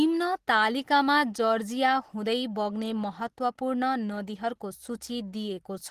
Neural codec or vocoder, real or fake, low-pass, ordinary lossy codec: none; real; 14.4 kHz; Opus, 16 kbps